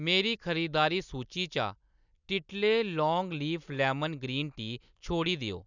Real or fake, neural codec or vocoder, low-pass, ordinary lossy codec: real; none; 7.2 kHz; none